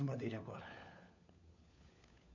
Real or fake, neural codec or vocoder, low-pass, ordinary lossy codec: fake; codec, 16 kHz, 4 kbps, FunCodec, trained on LibriTTS, 50 frames a second; 7.2 kHz; none